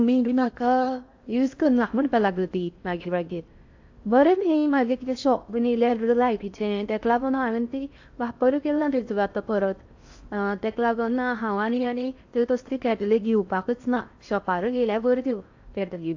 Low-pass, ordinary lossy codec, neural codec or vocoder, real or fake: 7.2 kHz; AAC, 48 kbps; codec, 16 kHz in and 24 kHz out, 0.6 kbps, FocalCodec, streaming, 4096 codes; fake